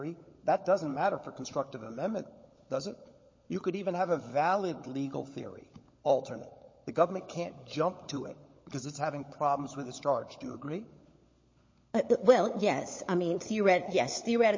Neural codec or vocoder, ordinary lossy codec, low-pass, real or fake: codec, 16 kHz, 16 kbps, FunCodec, trained on LibriTTS, 50 frames a second; MP3, 32 kbps; 7.2 kHz; fake